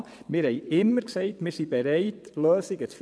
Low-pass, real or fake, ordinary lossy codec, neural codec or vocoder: none; fake; none; vocoder, 22.05 kHz, 80 mel bands, WaveNeXt